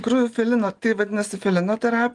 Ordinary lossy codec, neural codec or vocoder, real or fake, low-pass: Opus, 24 kbps; none; real; 10.8 kHz